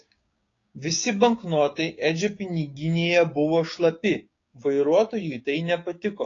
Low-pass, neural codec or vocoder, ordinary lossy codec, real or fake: 7.2 kHz; codec, 16 kHz, 6 kbps, DAC; AAC, 32 kbps; fake